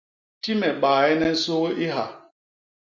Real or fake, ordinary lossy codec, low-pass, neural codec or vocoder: real; Opus, 64 kbps; 7.2 kHz; none